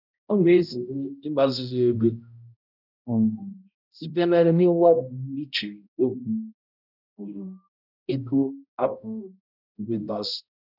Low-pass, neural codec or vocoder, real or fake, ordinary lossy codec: 5.4 kHz; codec, 16 kHz, 0.5 kbps, X-Codec, HuBERT features, trained on balanced general audio; fake; none